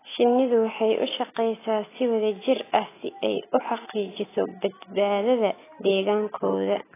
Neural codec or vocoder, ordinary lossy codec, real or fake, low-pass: none; AAC, 16 kbps; real; 3.6 kHz